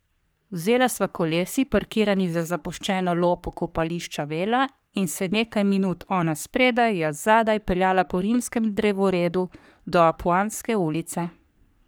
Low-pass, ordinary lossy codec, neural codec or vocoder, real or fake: none; none; codec, 44.1 kHz, 3.4 kbps, Pupu-Codec; fake